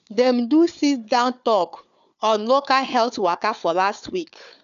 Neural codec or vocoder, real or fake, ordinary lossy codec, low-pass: codec, 16 kHz, 4 kbps, FunCodec, trained on Chinese and English, 50 frames a second; fake; none; 7.2 kHz